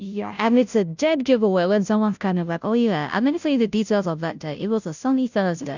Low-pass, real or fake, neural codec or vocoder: 7.2 kHz; fake; codec, 16 kHz, 0.5 kbps, FunCodec, trained on Chinese and English, 25 frames a second